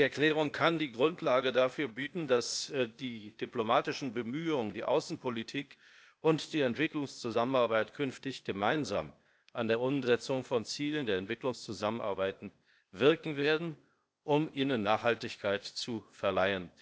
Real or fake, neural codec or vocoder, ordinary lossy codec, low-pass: fake; codec, 16 kHz, 0.8 kbps, ZipCodec; none; none